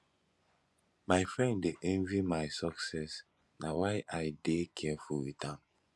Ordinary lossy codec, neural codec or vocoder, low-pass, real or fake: none; vocoder, 24 kHz, 100 mel bands, Vocos; none; fake